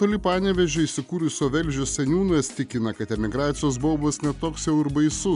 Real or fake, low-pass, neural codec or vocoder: real; 10.8 kHz; none